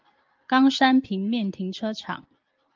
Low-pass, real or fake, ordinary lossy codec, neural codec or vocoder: 7.2 kHz; real; Opus, 32 kbps; none